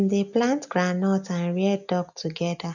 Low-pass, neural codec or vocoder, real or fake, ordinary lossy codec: 7.2 kHz; none; real; none